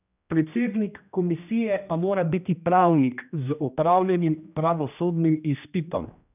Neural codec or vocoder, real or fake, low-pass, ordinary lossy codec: codec, 16 kHz, 1 kbps, X-Codec, HuBERT features, trained on general audio; fake; 3.6 kHz; none